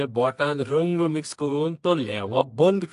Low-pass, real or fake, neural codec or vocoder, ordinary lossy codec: 10.8 kHz; fake; codec, 24 kHz, 0.9 kbps, WavTokenizer, medium music audio release; AAC, 64 kbps